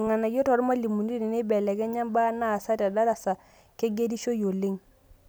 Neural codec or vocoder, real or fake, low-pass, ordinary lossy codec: none; real; none; none